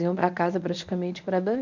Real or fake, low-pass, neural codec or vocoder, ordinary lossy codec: fake; 7.2 kHz; codec, 24 kHz, 0.9 kbps, WavTokenizer, medium speech release version 1; none